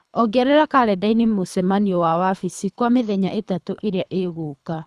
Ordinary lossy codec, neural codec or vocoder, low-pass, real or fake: none; codec, 24 kHz, 3 kbps, HILCodec; none; fake